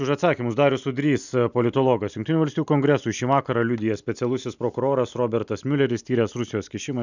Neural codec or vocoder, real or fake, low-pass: none; real; 7.2 kHz